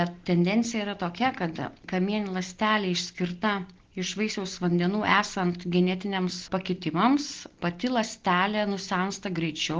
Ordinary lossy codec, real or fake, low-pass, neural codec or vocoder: Opus, 16 kbps; real; 7.2 kHz; none